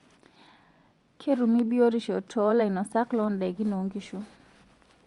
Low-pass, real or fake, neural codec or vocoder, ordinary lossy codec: 10.8 kHz; fake; vocoder, 24 kHz, 100 mel bands, Vocos; Opus, 64 kbps